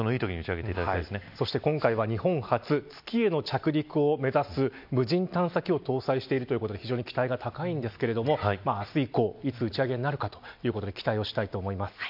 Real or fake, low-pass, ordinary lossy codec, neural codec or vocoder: real; 5.4 kHz; MP3, 48 kbps; none